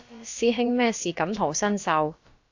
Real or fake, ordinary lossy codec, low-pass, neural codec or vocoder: fake; AAC, 48 kbps; 7.2 kHz; codec, 16 kHz, about 1 kbps, DyCAST, with the encoder's durations